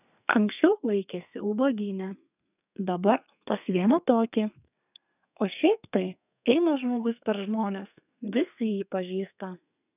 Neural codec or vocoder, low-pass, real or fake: codec, 32 kHz, 1.9 kbps, SNAC; 3.6 kHz; fake